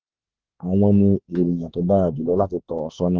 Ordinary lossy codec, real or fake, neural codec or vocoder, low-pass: Opus, 16 kbps; fake; autoencoder, 48 kHz, 32 numbers a frame, DAC-VAE, trained on Japanese speech; 7.2 kHz